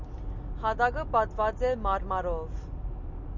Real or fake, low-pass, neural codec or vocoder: real; 7.2 kHz; none